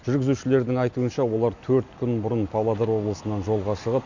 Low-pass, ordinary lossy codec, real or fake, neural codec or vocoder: 7.2 kHz; none; real; none